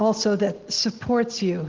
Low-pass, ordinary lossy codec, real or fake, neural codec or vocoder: 7.2 kHz; Opus, 16 kbps; real; none